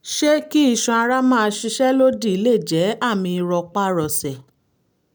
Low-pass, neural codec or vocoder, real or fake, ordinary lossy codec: none; none; real; none